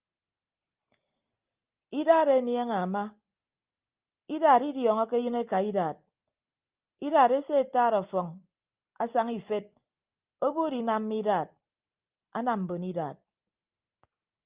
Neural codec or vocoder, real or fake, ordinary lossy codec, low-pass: none; real; Opus, 24 kbps; 3.6 kHz